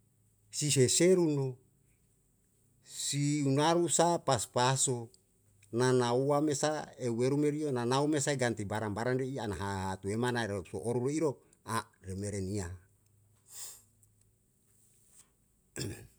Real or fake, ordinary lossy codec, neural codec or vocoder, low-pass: real; none; none; none